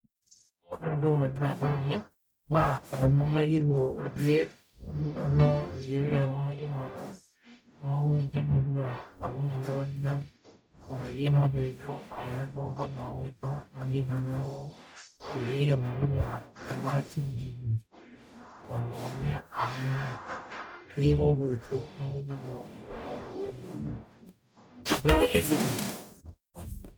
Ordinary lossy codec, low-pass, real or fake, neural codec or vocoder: none; none; fake; codec, 44.1 kHz, 0.9 kbps, DAC